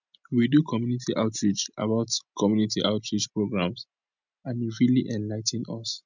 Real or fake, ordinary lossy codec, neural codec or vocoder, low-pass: real; none; none; 7.2 kHz